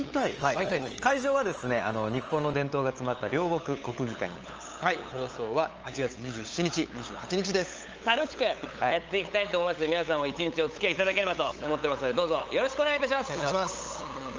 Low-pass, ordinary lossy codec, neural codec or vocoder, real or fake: 7.2 kHz; Opus, 24 kbps; codec, 16 kHz, 8 kbps, FunCodec, trained on LibriTTS, 25 frames a second; fake